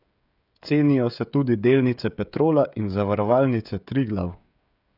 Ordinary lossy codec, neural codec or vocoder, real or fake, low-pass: none; codec, 16 kHz, 16 kbps, FreqCodec, smaller model; fake; 5.4 kHz